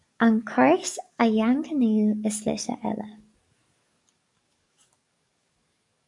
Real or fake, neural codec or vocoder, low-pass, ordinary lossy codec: fake; codec, 44.1 kHz, 7.8 kbps, DAC; 10.8 kHz; MP3, 96 kbps